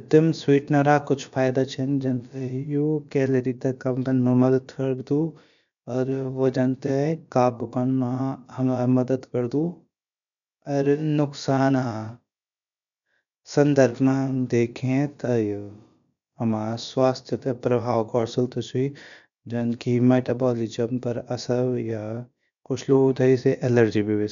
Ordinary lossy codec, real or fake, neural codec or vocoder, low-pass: MP3, 96 kbps; fake; codec, 16 kHz, about 1 kbps, DyCAST, with the encoder's durations; 7.2 kHz